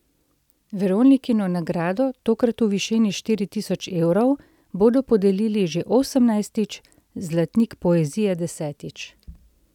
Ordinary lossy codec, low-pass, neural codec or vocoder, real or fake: none; 19.8 kHz; none; real